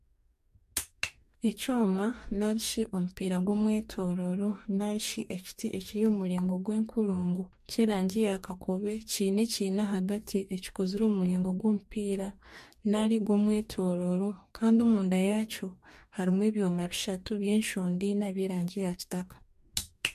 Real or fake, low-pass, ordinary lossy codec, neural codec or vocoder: fake; 14.4 kHz; MP3, 64 kbps; codec, 44.1 kHz, 2.6 kbps, DAC